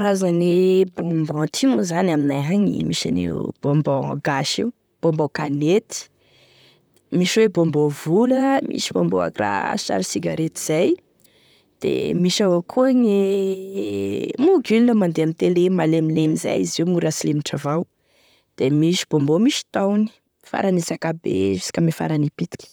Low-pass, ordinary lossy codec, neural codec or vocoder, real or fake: none; none; vocoder, 44.1 kHz, 128 mel bands, Pupu-Vocoder; fake